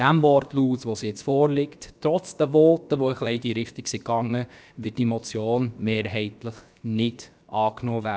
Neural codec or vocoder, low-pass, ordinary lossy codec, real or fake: codec, 16 kHz, about 1 kbps, DyCAST, with the encoder's durations; none; none; fake